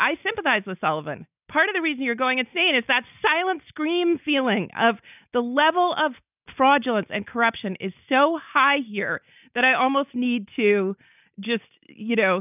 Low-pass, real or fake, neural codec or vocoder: 3.6 kHz; real; none